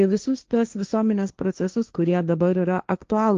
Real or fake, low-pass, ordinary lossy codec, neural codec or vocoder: fake; 7.2 kHz; Opus, 32 kbps; codec, 16 kHz, 1.1 kbps, Voila-Tokenizer